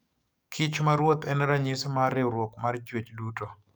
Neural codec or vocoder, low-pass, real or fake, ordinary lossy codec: codec, 44.1 kHz, 7.8 kbps, DAC; none; fake; none